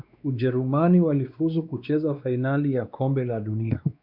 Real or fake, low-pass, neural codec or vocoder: fake; 5.4 kHz; codec, 16 kHz, 2 kbps, X-Codec, WavLM features, trained on Multilingual LibriSpeech